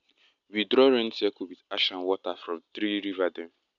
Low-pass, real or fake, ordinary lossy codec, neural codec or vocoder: 7.2 kHz; real; none; none